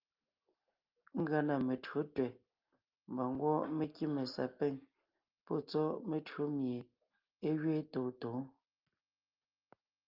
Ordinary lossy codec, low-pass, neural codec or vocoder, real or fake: Opus, 24 kbps; 5.4 kHz; none; real